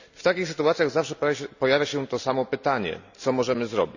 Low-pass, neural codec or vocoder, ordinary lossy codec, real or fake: 7.2 kHz; none; none; real